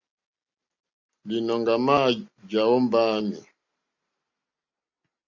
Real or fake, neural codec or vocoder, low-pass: real; none; 7.2 kHz